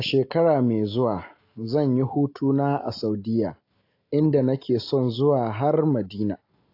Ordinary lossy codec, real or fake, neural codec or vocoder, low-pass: AAC, 32 kbps; real; none; 5.4 kHz